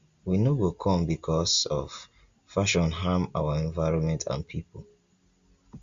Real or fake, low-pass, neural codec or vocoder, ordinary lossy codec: real; 7.2 kHz; none; Opus, 64 kbps